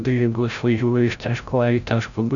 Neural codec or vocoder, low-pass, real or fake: codec, 16 kHz, 0.5 kbps, FreqCodec, larger model; 7.2 kHz; fake